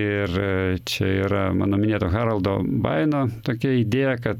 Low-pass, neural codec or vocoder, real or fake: 19.8 kHz; none; real